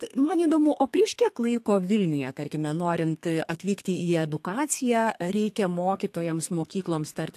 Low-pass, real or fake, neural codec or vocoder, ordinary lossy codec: 14.4 kHz; fake; codec, 32 kHz, 1.9 kbps, SNAC; AAC, 64 kbps